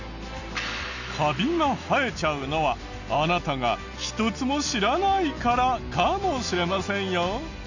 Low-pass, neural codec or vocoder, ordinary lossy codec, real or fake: 7.2 kHz; none; none; real